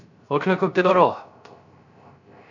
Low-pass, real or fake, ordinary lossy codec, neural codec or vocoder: 7.2 kHz; fake; none; codec, 16 kHz, 0.3 kbps, FocalCodec